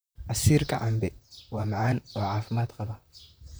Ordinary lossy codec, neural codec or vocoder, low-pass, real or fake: none; vocoder, 44.1 kHz, 128 mel bands, Pupu-Vocoder; none; fake